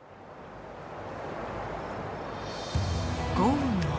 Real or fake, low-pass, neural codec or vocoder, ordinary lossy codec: real; none; none; none